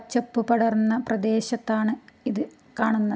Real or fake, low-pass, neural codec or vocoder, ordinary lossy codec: real; none; none; none